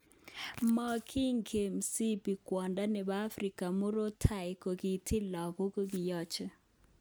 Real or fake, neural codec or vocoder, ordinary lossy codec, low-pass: real; none; none; none